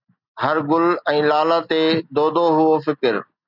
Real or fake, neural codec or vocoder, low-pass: real; none; 5.4 kHz